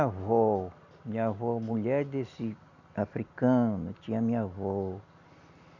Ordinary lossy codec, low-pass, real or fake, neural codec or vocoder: none; 7.2 kHz; real; none